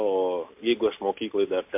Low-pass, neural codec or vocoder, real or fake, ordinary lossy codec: 3.6 kHz; none; real; MP3, 32 kbps